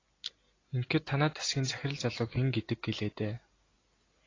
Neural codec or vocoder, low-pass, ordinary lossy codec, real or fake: none; 7.2 kHz; AAC, 32 kbps; real